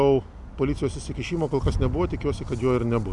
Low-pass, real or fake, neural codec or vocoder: 10.8 kHz; real; none